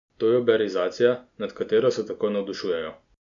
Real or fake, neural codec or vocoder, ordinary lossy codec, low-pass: real; none; MP3, 96 kbps; 7.2 kHz